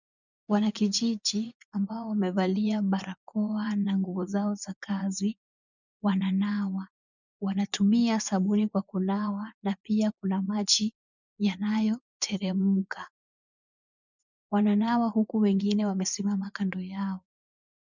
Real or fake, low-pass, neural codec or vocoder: fake; 7.2 kHz; vocoder, 24 kHz, 100 mel bands, Vocos